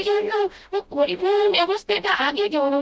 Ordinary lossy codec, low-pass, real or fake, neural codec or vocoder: none; none; fake; codec, 16 kHz, 0.5 kbps, FreqCodec, smaller model